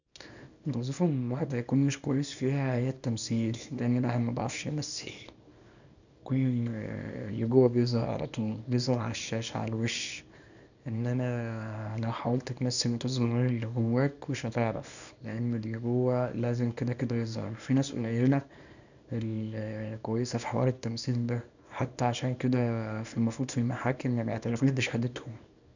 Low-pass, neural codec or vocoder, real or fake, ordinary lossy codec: 7.2 kHz; codec, 24 kHz, 0.9 kbps, WavTokenizer, small release; fake; none